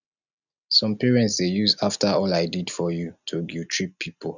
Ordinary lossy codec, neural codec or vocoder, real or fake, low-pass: none; none; real; 7.2 kHz